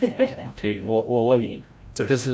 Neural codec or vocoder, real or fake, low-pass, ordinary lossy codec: codec, 16 kHz, 0.5 kbps, FreqCodec, larger model; fake; none; none